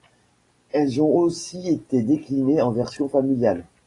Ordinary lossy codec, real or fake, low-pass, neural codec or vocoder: AAC, 32 kbps; real; 10.8 kHz; none